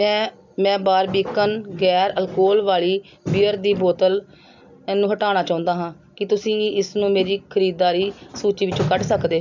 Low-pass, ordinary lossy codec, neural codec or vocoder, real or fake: 7.2 kHz; none; none; real